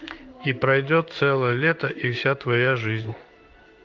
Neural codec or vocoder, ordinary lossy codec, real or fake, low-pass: codec, 16 kHz in and 24 kHz out, 1 kbps, XY-Tokenizer; Opus, 32 kbps; fake; 7.2 kHz